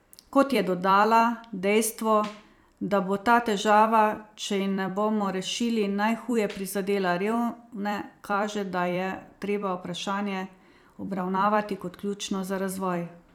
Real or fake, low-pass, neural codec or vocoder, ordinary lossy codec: fake; 19.8 kHz; vocoder, 44.1 kHz, 128 mel bands every 256 samples, BigVGAN v2; none